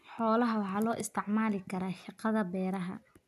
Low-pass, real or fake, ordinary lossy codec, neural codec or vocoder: 14.4 kHz; real; none; none